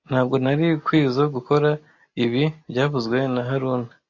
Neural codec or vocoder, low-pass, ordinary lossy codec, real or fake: none; 7.2 kHz; AAC, 48 kbps; real